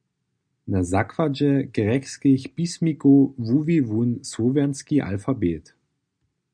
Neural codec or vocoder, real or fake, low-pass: none; real; 9.9 kHz